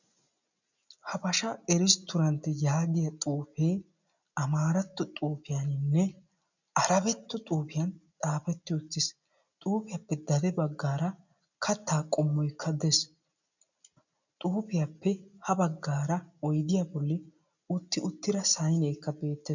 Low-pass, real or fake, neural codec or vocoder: 7.2 kHz; real; none